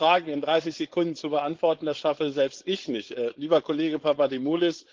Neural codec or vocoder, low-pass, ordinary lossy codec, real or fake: codec, 16 kHz, 4.8 kbps, FACodec; 7.2 kHz; Opus, 16 kbps; fake